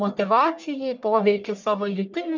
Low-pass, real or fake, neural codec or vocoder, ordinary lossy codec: 7.2 kHz; fake; codec, 44.1 kHz, 1.7 kbps, Pupu-Codec; MP3, 64 kbps